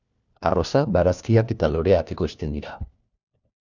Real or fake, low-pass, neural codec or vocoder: fake; 7.2 kHz; codec, 16 kHz, 1 kbps, FunCodec, trained on LibriTTS, 50 frames a second